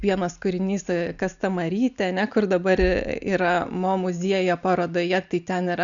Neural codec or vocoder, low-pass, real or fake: none; 7.2 kHz; real